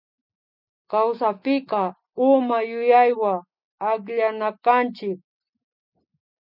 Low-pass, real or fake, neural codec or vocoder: 5.4 kHz; real; none